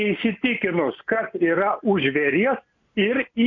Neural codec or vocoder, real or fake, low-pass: none; real; 7.2 kHz